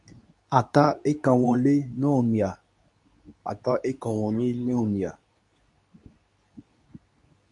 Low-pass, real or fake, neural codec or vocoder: 10.8 kHz; fake; codec, 24 kHz, 0.9 kbps, WavTokenizer, medium speech release version 2